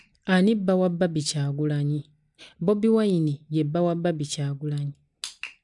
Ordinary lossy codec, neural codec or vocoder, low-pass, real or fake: MP3, 96 kbps; none; 10.8 kHz; real